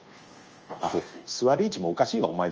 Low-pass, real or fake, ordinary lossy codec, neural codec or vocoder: 7.2 kHz; fake; Opus, 24 kbps; codec, 24 kHz, 1.2 kbps, DualCodec